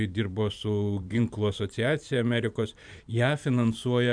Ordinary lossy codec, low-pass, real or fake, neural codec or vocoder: MP3, 96 kbps; 9.9 kHz; fake; vocoder, 44.1 kHz, 128 mel bands every 512 samples, BigVGAN v2